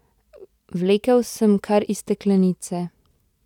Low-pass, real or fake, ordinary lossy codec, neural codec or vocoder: 19.8 kHz; real; none; none